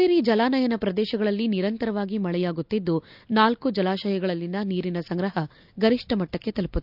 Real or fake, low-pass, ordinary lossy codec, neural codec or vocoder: real; 5.4 kHz; none; none